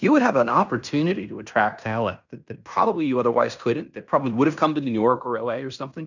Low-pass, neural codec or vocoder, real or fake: 7.2 kHz; codec, 16 kHz in and 24 kHz out, 0.9 kbps, LongCat-Audio-Codec, fine tuned four codebook decoder; fake